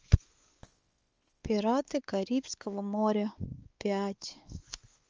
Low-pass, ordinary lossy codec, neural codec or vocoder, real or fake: 7.2 kHz; Opus, 24 kbps; codec, 16 kHz, 4 kbps, X-Codec, WavLM features, trained on Multilingual LibriSpeech; fake